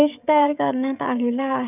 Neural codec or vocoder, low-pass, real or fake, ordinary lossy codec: codec, 16 kHz, 16 kbps, FreqCodec, smaller model; 3.6 kHz; fake; none